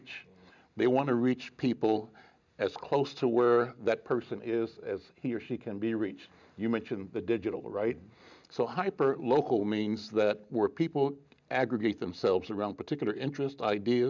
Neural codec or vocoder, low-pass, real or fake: none; 7.2 kHz; real